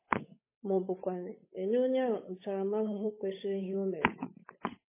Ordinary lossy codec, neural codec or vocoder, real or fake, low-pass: MP3, 16 kbps; codec, 16 kHz, 8 kbps, FunCodec, trained on Chinese and English, 25 frames a second; fake; 3.6 kHz